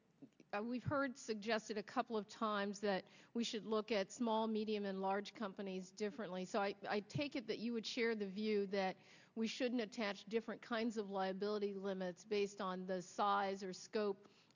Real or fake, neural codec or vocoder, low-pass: real; none; 7.2 kHz